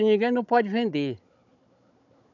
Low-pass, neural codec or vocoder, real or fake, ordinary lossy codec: 7.2 kHz; codec, 16 kHz, 16 kbps, FunCodec, trained on Chinese and English, 50 frames a second; fake; none